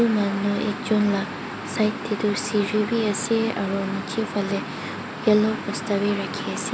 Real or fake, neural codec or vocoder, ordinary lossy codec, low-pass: real; none; none; none